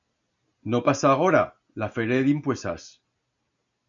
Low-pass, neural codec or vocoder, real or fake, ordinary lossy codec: 7.2 kHz; none; real; MP3, 96 kbps